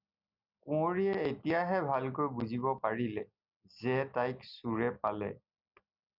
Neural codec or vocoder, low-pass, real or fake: none; 5.4 kHz; real